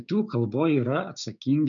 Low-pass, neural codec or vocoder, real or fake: 7.2 kHz; codec, 16 kHz, 6 kbps, DAC; fake